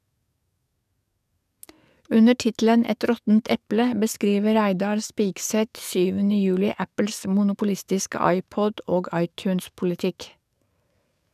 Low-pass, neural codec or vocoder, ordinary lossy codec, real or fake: 14.4 kHz; codec, 44.1 kHz, 7.8 kbps, DAC; none; fake